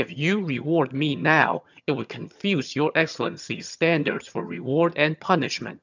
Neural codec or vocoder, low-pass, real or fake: vocoder, 22.05 kHz, 80 mel bands, HiFi-GAN; 7.2 kHz; fake